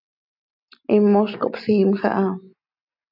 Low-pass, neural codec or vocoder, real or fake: 5.4 kHz; none; real